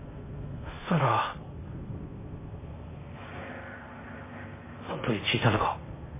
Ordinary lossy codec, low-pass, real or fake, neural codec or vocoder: MP3, 16 kbps; 3.6 kHz; fake; codec, 16 kHz in and 24 kHz out, 0.6 kbps, FocalCodec, streaming, 4096 codes